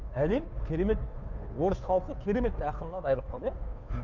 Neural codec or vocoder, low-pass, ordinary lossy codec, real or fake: codec, 16 kHz, 2 kbps, FunCodec, trained on Chinese and English, 25 frames a second; 7.2 kHz; Opus, 64 kbps; fake